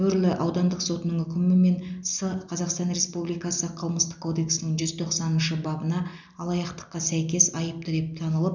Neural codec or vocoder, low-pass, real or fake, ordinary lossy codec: none; 7.2 kHz; real; none